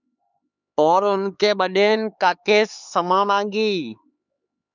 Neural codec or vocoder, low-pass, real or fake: codec, 16 kHz, 4 kbps, X-Codec, HuBERT features, trained on LibriSpeech; 7.2 kHz; fake